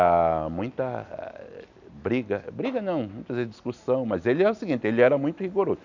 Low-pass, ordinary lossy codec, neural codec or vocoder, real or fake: 7.2 kHz; none; none; real